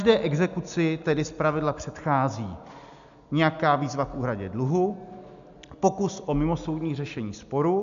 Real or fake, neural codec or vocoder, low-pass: real; none; 7.2 kHz